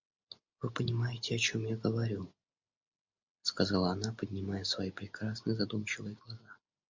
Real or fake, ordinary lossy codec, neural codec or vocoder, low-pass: real; MP3, 64 kbps; none; 7.2 kHz